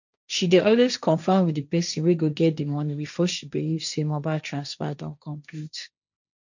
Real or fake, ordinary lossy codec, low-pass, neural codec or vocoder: fake; none; 7.2 kHz; codec, 16 kHz, 1.1 kbps, Voila-Tokenizer